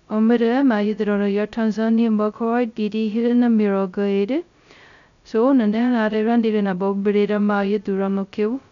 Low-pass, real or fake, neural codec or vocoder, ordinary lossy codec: 7.2 kHz; fake; codec, 16 kHz, 0.2 kbps, FocalCodec; none